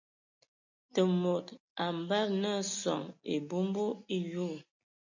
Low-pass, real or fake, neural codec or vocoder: 7.2 kHz; real; none